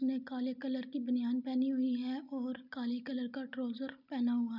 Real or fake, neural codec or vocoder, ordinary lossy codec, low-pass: real; none; none; 5.4 kHz